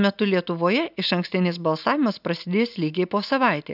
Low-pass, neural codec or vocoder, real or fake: 5.4 kHz; none; real